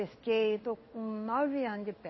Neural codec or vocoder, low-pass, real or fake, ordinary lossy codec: codec, 16 kHz in and 24 kHz out, 1 kbps, XY-Tokenizer; 7.2 kHz; fake; MP3, 24 kbps